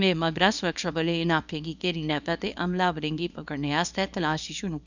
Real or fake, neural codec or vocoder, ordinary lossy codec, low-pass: fake; codec, 24 kHz, 0.9 kbps, WavTokenizer, small release; none; 7.2 kHz